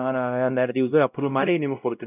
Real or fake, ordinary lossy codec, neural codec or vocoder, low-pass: fake; none; codec, 16 kHz, 0.5 kbps, X-Codec, WavLM features, trained on Multilingual LibriSpeech; 3.6 kHz